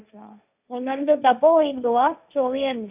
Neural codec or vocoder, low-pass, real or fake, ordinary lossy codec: codec, 16 kHz, 1.1 kbps, Voila-Tokenizer; 3.6 kHz; fake; none